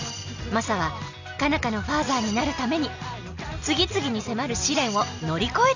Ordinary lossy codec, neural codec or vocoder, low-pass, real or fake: none; none; 7.2 kHz; real